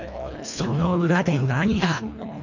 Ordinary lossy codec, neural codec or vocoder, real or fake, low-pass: none; codec, 24 kHz, 1.5 kbps, HILCodec; fake; 7.2 kHz